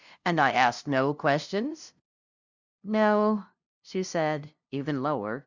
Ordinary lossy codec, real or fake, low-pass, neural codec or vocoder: Opus, 64 kbps; fake; 7.2 kHz; codec, 16 kHz, 0.5 kbps, FunCodec, trained on LibriTTS, 25 frames a second